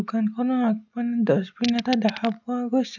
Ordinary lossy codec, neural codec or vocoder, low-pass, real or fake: none; none; 7.2 kHz; real